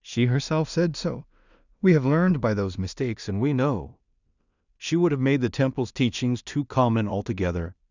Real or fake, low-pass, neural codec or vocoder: fake; 7.2 kHz; codec, 16 kHz in and 24 kHz out, 0.4 kbps, LongCat-Audio-Codec, two codebook decoder